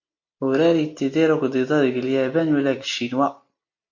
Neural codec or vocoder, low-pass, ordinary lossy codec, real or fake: none; 7.2 kHz; MP3, 48 kbps; real